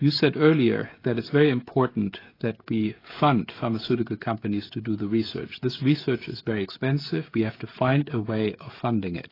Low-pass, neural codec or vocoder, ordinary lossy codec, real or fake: 5.4 kHz; codec, 16 kHz, 16 kbps, FreqCodec, smaller model; AAC, 24 kbps; fake